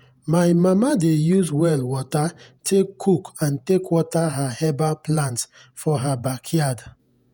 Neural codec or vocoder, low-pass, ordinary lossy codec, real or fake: vocoder, 48 kHz, 128 mel bands, Vocos; none; none; fake